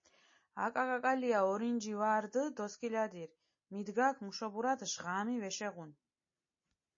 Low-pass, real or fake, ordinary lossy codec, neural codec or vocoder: 7.2 kHz; real; MP3, 32 kbps; none